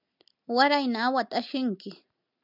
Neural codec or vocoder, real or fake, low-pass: none; real; 5.4 kHz